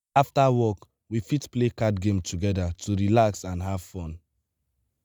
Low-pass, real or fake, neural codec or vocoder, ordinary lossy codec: none; real; none; none